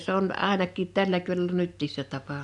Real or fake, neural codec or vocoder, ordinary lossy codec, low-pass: real; none; none; 10.8 kHz